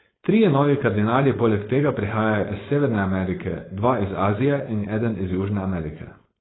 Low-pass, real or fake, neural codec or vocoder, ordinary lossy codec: 7.2 kHz; fake; codec, 16 kHz, 4.8 kbps, FACodec; AAC, 16 kbps